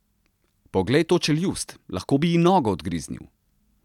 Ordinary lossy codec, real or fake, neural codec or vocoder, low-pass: none; real; none; 19.8 kHz